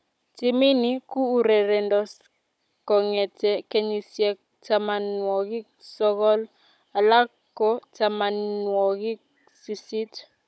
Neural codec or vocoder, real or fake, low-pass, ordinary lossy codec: codec, 16 kHz, 16 kbps, FunCodec, trained on Chinese and English, 50 frames a second; fake; none; none